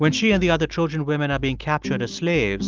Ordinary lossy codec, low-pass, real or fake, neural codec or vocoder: Opus, 32 kbps; 7.2 kHz; real; none